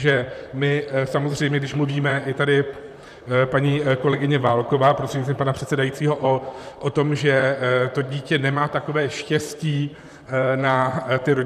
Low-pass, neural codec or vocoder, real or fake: 14.4 kHz; vocoder, 44.1 kHz, 128 mel bands, Pupu-Vocoder; fake